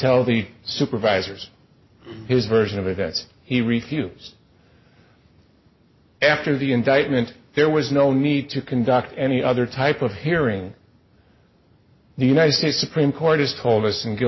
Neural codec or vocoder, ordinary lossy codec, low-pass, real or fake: none; MP3, 24 kbps; 7.2 kHz; real